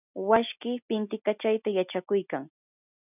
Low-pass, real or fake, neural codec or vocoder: 3.6 kHz; real; none